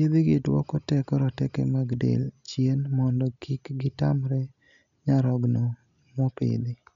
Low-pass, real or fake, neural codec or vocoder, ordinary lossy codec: 7.2 kHz; real; none; none